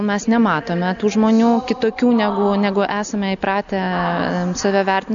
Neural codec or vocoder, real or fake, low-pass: none; real; 7.2 kHz